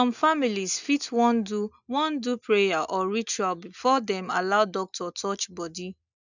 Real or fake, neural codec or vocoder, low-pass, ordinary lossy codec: real; none; 7.2 kHz; none